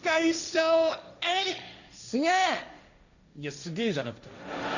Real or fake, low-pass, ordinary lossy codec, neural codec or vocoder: fake; 7.2 kHz; none; codec, 16 kHz, 1.1 kbps, Voila-Tokenizer